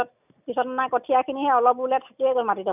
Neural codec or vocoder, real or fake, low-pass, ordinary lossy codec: none; real; 3.6 kHz; none